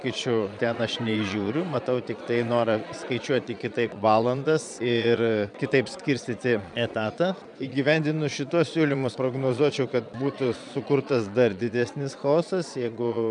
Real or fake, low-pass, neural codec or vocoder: fake; 9.9 kHz; vocoder, 22.05 kHz, 80 mel bands, Vocos